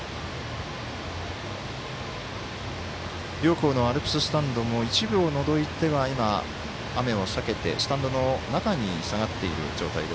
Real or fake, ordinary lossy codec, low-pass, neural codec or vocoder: real; none; none; none